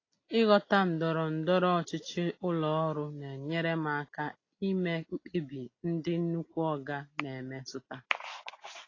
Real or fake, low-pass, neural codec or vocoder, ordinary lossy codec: real; 7.2 kHz; none; AAC, 32 kbps